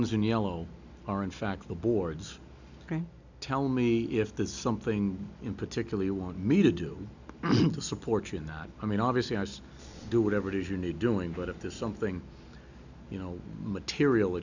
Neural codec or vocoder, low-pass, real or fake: none; 7.2 kHz; real